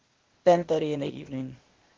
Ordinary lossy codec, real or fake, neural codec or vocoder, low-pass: Opus, 16 kbps; fake; codec, 16 kHz, 0.8 kbps, ZipCodec; 7.2 kHz